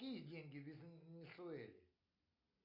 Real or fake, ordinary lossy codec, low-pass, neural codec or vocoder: real; MP3, 32 kbps; 5.4 kHz; none